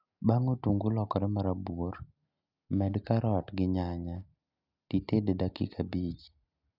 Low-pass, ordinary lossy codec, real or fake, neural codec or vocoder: 5.4 kHz; none; real; none